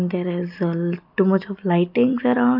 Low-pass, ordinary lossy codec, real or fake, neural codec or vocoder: 5.4 kHz; none; real; none